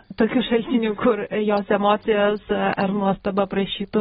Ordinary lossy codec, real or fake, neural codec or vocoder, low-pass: AAC, 16 kbps; real; none; 19.8 kHz